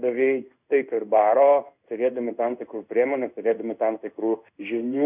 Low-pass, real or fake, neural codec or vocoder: 3.6 kHz; fake; codec, 16 kHz in and 24 kHz out, 1 kbps, XY-Tokenizer